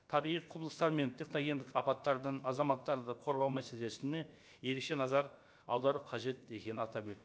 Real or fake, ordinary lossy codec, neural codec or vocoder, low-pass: fake; none; codec, 16 kHz, about 1 kbps, DyCAST, with the encoder's durations; none